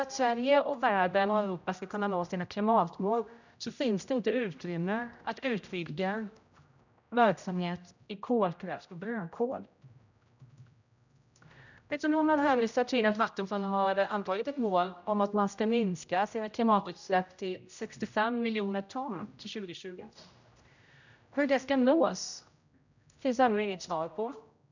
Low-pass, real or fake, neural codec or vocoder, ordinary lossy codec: 7.2 kHz; fake; codec, 16 kHz, 0.5 kbps, X-Codec, HuBERT features, trained on general audio; none